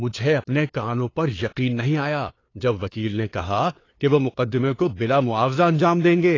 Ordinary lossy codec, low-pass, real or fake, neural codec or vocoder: AAC, 32 kbps; 7.2 kHz; fake; codec, 16 kHz, 2 kbps, FunCodec, trained on LibriTTS, 25 frames a second